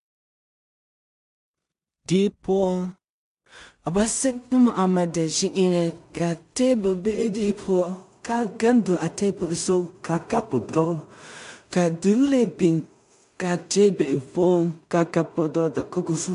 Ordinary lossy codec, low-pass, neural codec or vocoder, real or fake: MP3, 64 kbps; 10.8 kHz; codec, 16 kHz in and 24 kHz out, 0.4 kbps, LongCat-Audio-Codec, two codebook decoder; fake